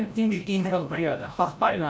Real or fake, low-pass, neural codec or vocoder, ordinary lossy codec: fake; none; codec, 16 kHz, 0.5 kbps, FreqCodec, larger model; none